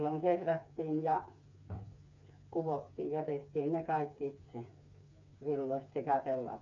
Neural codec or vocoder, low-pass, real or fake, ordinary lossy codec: codec, 16 kHz, 4 kbps, FreqCodec, smaller model; 7.2 kHz; fake; AAC, 48 kbps